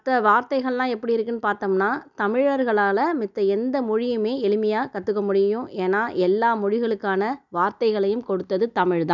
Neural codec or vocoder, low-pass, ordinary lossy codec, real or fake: none; 7.2 kHz; none; real